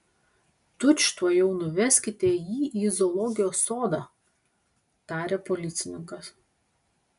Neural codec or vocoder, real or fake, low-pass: none; real; 10.8 kHz